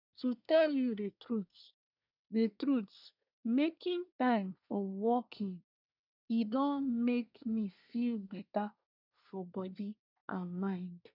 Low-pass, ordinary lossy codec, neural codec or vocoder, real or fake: 5.4 kHz; none; codec, 24 kHz, 1 kbps, SNAC; fake